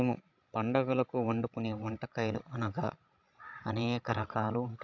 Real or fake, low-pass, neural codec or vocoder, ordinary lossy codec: fake; 7.2 kHz; codec, 16 kHz, 16 kbps, FreqCodec, larger model; AAC, 48 kbps